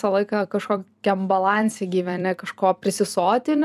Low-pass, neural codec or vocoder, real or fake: 14.4 kHz; vocoder, 44.1 kHz, 128 mel bands every 256 samples, BigVGAN v2; fake